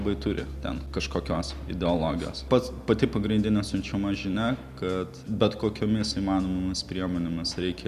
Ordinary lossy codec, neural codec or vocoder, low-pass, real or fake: Opus, 64 kbps; none; 14.4 kHz; real